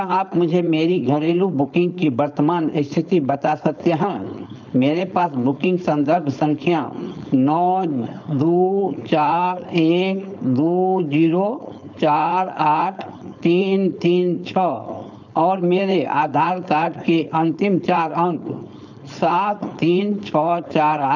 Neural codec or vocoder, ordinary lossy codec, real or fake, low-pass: codec, 16 kHz, 4.8 kbps, FACodec; none; fake; 7.2 kHz